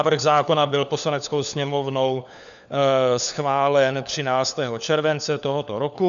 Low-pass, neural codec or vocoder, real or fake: 7.2 kHz; codec, 16 kHz, 4 kbps, FunCodec, trained on LibriTTS, 50 frames a second; fake